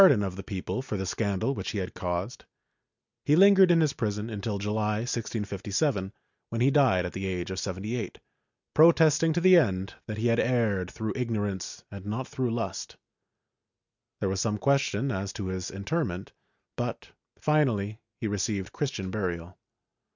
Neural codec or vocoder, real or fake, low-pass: none; real; 7.2 kHz